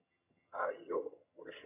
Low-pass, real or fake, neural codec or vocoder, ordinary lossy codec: 3.6 kHz; fake; vocoder, 22.05 kHz, 80 mel bands, HiFi-GAN; none